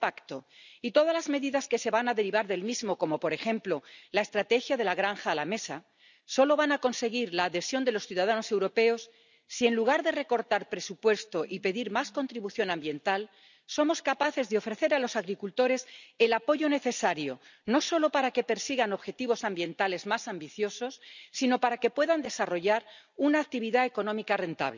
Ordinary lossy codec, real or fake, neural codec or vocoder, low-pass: none; real; none; 7.2 kHz